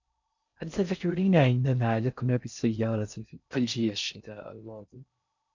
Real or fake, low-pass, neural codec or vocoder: fake; 7.2 kHz; codec, 16 kHz in and 24 kHz out, 0.6 kbps, FocalCodec, streaming, 4096 codes